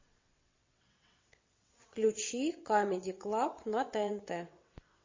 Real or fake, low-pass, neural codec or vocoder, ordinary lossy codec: fake; 7.2 kHz; vocoder, 22.05 kHz, 80 mel bands, WaveNeXt; MP3, 32 kbps